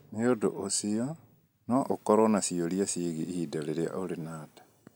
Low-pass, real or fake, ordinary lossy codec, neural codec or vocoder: none; real; none; none